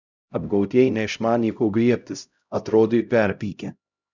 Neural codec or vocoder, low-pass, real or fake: codec, 16 kHz, 0.5 kbps, X-Codec, HuBERT features, trained on LibriSpeech; 7.2 kHz; fake